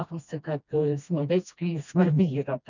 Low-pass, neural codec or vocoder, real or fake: 7.2 kHz; codec, 16 kHz, 1 kbps, FreqCodec, smaller model; fake